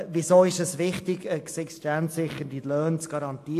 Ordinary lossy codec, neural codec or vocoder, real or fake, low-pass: AAC, 64 kbps; none; real; 14.4 kHz